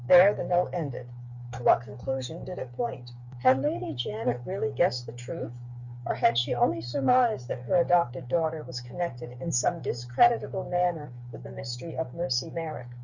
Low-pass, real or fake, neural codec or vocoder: 7.2 kHz; fake; codec, 16 kHz, 8 kbps, FreqCodec, smaller model